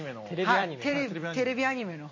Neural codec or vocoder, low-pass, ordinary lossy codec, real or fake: vocoder, 44.1 kHz, 128 mel bands every 256 samples, BigVGAN v2; 7.2 kHz; MP3, 32 kbps; fake